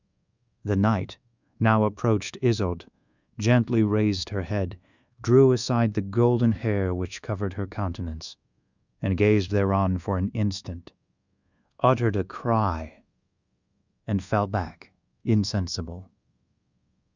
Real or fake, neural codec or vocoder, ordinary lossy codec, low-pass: fake; codec, 24 kHz, 1.2 kbps, DualCodec; Opus, 64 kbps; 7.2 kHz